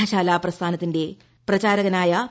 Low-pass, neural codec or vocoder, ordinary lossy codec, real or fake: none; none; none; real